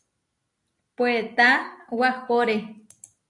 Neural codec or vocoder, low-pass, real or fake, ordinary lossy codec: none; 10.8 kHz; real; AAC, 48 kbps